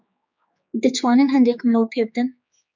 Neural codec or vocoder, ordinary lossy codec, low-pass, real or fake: codec, 16 kHz, 4 kbps, X-Codec, HuBERT features, trained on general audio; MP3, 64 kbps; 7.2 kHz; fake